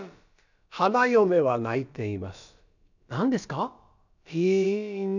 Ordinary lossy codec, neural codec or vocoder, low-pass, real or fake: none; codec, 16 kHz, about 1 kbps, DyCAST, with the encoder's durations; 7.2 kHz; fake